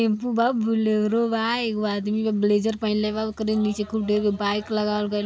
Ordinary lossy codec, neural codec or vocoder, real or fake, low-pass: none; none; real; none